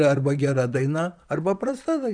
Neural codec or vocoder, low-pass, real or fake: vocoder, 44.1 kHz, 128 mel bands, Pupu-Vocoder; 9.9 kHz; fake